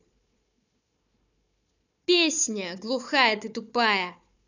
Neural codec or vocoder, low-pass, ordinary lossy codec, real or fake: codec, 16 kHz, 4 kbps, FunCodec, trained on Chinese and English, 50 frames a second; 7.2 kHz; none; fake